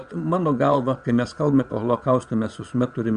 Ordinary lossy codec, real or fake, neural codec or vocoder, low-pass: Opus, 64 kbps; fake; vocoder, 22.05 kHz, 80 mel bands, Vocos; 9.9 kHz